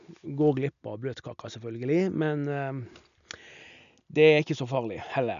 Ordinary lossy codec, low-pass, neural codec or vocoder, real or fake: none; 7.2 kHz; none; real